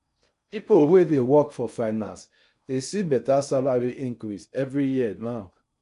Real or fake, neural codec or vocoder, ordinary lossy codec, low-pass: fake; codec, 16 kHz in and 24 kHz out, 0.6 kbps, FocalCodec, streaming, 4096 codes; none; 10.8 kHz